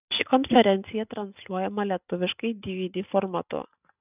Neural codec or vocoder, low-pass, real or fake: none; 3.6 kHz; real